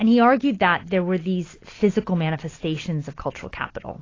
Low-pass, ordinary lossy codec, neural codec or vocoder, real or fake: 7.2 kHz; AAC, 32 kbps; none; real